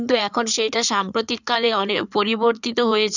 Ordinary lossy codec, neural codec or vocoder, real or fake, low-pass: none; codec, 16 kHz in and 24 kHz out, 2.2 kbps, FireRedTTS-2 codec; fake; 7.2 kHz